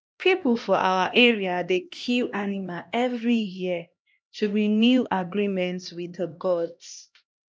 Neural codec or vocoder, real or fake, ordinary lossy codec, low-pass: codec, 16 kHz, 1 kbps, X-Codec, HuBERT features, trained on LibriSpeech; fake; none; none